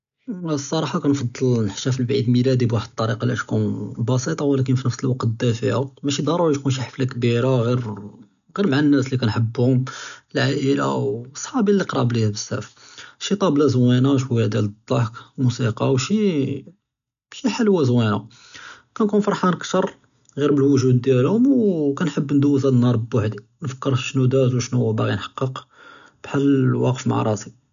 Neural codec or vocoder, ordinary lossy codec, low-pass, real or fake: none; none; 7.2 kHz; real